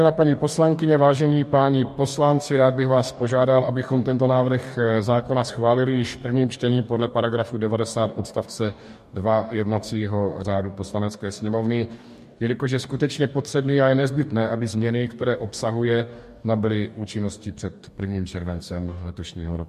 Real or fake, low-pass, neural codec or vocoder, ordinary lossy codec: fake; 14.4 kHz; codec, 44.1 kHz, 2.6 kbps, DAC; MP3, 64 kbps